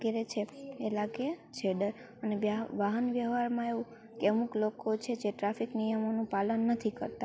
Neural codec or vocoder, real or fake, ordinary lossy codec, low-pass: none; real; none; none